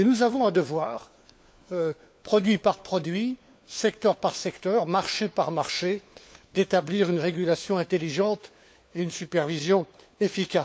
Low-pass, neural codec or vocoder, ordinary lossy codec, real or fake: none; codec, 16 kHz, 4 kbps, FunCodec, trained on LibriTTS, 50 frames a second; none; fake